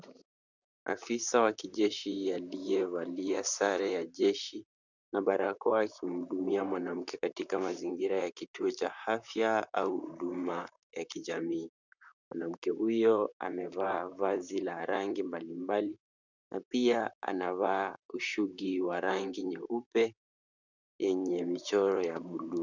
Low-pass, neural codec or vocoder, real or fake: 7.2 kHz; vocoder, 44.1 kHz, 128 mel bands, Pupu-Vocoder; fake